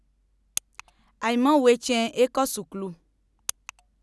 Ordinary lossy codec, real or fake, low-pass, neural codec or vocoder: none; real; none; none